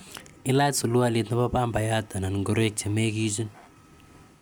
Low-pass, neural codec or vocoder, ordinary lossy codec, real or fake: none; none; none; real